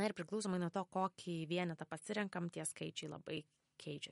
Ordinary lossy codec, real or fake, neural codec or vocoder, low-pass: MP3, 48 kbps; real; none; 19.8 kHz